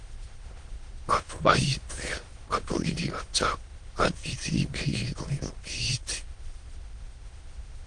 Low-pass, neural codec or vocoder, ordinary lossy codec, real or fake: 9.9 kHz; autoencoder, 22.05 kHz, a latent of 192 numbers a frame, VITS, trained on many speakers; Opus, 24 kbps; fake